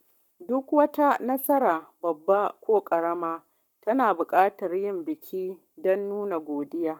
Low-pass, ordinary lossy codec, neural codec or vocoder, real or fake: 19.8 kHz; none; codec, 44.1 kHz, 7.8 kbps, Pupu-Codec; fake